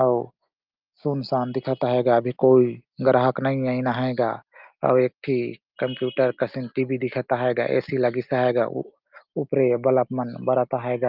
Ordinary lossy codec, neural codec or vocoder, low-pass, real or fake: Opus, 24 kbps; none; 5.4 kHz; real